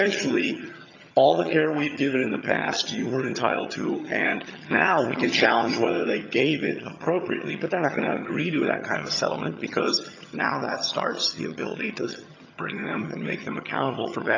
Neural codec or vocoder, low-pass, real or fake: vocoder, 22.05 kHz, 80 mel bands, HiFi-GAN; 7.2 kHz; fake